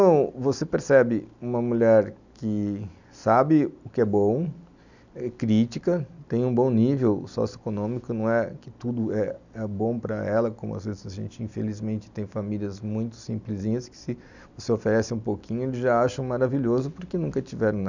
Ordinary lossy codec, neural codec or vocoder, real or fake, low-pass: none; none; real; 7.2 kHz